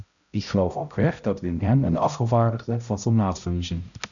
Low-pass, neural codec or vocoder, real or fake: 7.2 kHz; codec, 16 kHz, 0.5 kbps, X-Codec, HuBERT features, trained on balanced general audio; fake